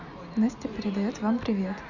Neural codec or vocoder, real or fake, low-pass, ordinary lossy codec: none; real; 7.2 kHz; none